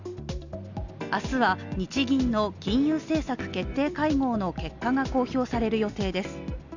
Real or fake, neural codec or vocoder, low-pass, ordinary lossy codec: real; none; 7.2 kHz; none